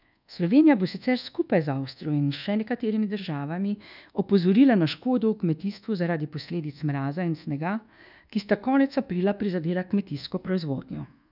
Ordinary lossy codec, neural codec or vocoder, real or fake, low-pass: none; codec, 24 kHz, 1.2 kbps, DualCodec; fake; 5.4 kHz